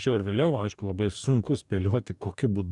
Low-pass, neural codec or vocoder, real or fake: 10.8 kHz; codec, 44.1 kHz, 2.6 kbps, DAC; fake